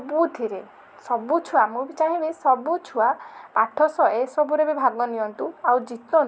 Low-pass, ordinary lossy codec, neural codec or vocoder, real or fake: none; none; none; real